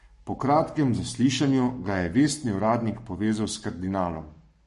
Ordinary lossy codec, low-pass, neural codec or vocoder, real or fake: MP3, 48 kbps; 14.4 kHz; codec, 44.1 kHz, 7.8 kbps, Pupu-Codec; fake